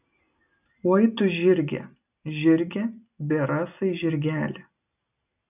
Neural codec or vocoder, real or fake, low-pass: none; real; 3.6 kHz